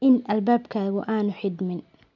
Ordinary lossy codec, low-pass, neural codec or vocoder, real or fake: none; 7.2 kHz; none; real